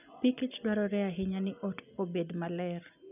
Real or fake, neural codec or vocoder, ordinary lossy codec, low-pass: real; none; none; 3.6 kHz